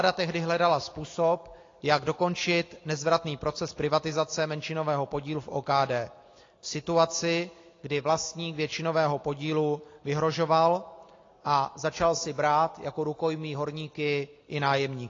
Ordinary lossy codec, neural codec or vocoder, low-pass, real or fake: AAC, 32 kbps; none; 7.2 kHz; real